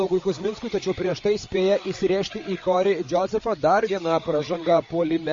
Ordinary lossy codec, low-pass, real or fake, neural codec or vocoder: MP3, 32 kbps; 7.2 kHz; fake; codec, 16 kHz, 8 kbps, FreqCodec, larger model